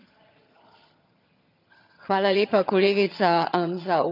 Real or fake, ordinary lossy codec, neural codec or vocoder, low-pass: fake; none; vocoder, 22.05 kHz, 80 mel bands, HiFi-GAN; 5.4 kHz